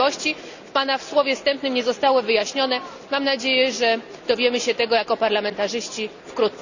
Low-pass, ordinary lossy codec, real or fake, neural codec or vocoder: 7.2 kHz; none; real; none